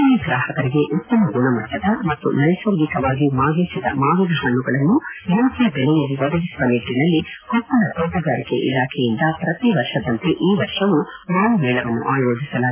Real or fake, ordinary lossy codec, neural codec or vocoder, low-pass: real; AAC, 24 kbps; none; 3.6 kHz